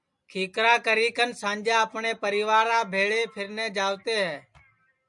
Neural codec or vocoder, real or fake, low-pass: none; real; 10.8 kHz